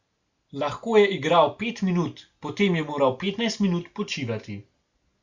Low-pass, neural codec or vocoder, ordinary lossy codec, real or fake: 7.2 kHz; none; Opus, 64 kbps; real